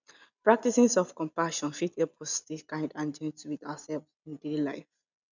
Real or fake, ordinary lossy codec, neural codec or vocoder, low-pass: real; none; none; 7.2 kHz